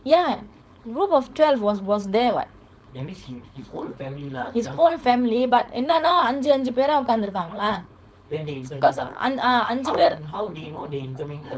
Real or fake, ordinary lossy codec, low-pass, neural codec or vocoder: fake; none; none; codec, 16 kHz, 4.8 kbps, FACodec